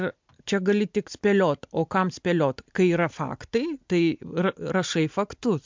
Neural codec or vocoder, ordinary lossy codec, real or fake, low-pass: none; MP3, 64 kbps; real; 7.2 kHz